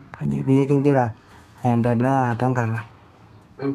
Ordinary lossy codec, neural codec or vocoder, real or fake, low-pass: none; codec, 32 kHz, 1.9 kbps, SNAC; fake; 14.4 kHz